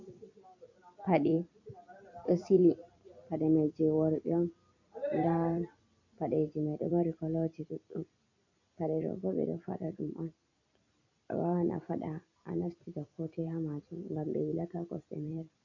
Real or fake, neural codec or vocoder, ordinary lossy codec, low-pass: fake; vocoder, 44.1 kHz, 128 mel bands every 256 samples, BigVGAN v2; AAC, 48 kbps; 7.2 kHz